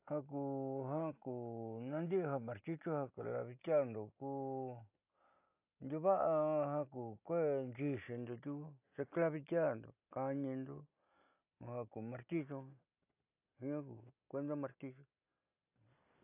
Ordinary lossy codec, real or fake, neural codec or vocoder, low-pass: AAC, 32 kbps; real; none; 3.6 kHz